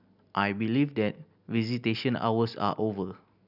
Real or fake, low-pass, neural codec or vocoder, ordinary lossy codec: real; 5.4 kHz; none; none